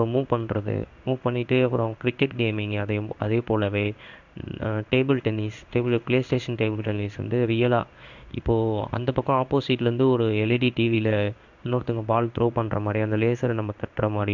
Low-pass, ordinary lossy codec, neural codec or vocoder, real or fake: 7.2 kHz; none; codec, 16 kHz in and 24 kHz out, 1 kbps, XY-Tokenizer; fake